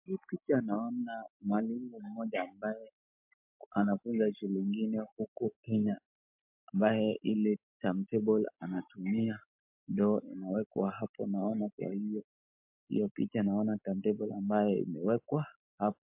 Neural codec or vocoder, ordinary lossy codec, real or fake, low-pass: none; MP3, 32 kbps; real; 3.6 kHz